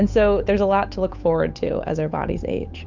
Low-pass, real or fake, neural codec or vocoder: 7.2 kHz; fake; codec, 16 kHz, 8 kbps, FunCodec, trained on Chinese and English, 25 frames a second